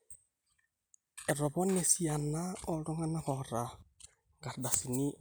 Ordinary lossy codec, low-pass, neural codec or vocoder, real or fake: none; none; none; real